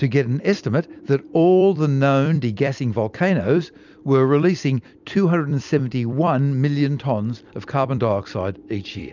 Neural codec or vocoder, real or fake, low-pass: vocoder, 44.1 kHz, 128 mel bands every 256 samples, BigVGAN v2; fake; 7.2 kHz